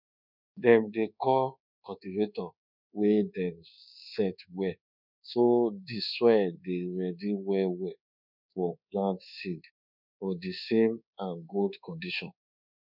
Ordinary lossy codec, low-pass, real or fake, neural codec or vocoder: none; 5.4 kHz; fake; codec, 24 kHz, 1.2 kbps, DualCodec